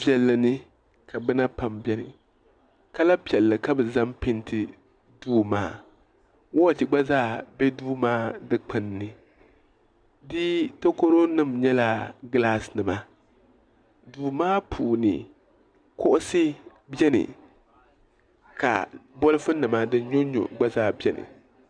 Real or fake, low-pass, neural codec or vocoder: real; 9.9 kHz; none